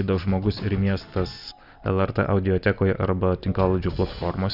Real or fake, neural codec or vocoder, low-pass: real; none; 5.4 kHz